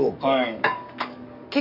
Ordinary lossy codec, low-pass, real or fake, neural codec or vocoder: none; 5.4 kHz; fake; codec, 44.1 kHz, 7.8 kbps, DAC